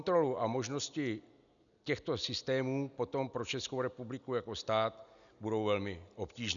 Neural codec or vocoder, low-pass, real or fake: none; 7.2 kHz; real